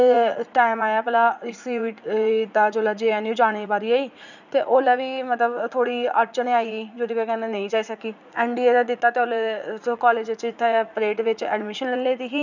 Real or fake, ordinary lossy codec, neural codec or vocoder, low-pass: fake; none; vocoder, 44.1 kHz, 80 mel bands, Vocos; 7.2 kHz